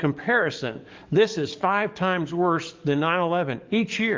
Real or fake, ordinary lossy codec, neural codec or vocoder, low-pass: fake; Opus, 32 kbps; codec, 44.1 kHz, 7.8 kbps, DAC; 7.2 kHz